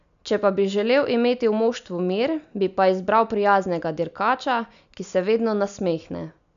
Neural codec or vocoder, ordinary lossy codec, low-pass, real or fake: none; none; 7.2 kHz; real